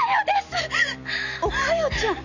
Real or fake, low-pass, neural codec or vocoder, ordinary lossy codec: real; 7.2 kHz; none; none